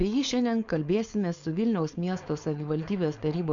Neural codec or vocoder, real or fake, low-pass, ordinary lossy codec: codec, 16 kHz, 4.8 kbps, FACodec; fake; 7.2 kHz; Opus, 64 kbps